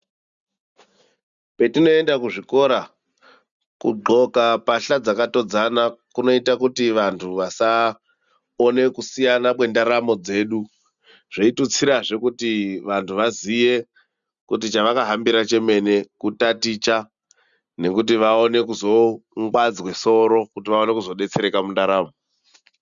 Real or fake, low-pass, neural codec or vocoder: real; 7.2 kHz; none